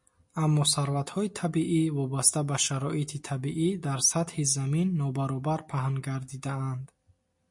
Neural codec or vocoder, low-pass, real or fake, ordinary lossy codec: none; 10.8 kHz; real; MP3, 48 kbps